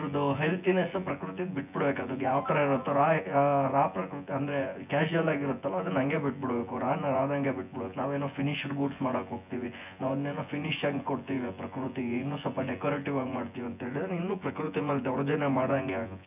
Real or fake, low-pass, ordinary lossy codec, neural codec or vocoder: fake; 3.6 kHz; none; vocoder, 24 kHz, 100 mel bands, Vocos